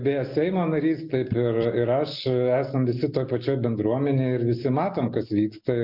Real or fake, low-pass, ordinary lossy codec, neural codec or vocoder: real; 5.4 kHz; MP3, 48 kbps; none